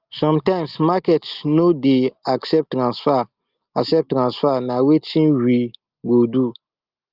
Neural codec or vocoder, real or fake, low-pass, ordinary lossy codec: none; real; 5.4 kHz; Opus, 32 kbps